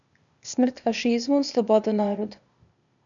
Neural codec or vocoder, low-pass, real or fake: codec, 16 kHz, 0.8 kbps, ZipCodec; 7.2 kHz; fake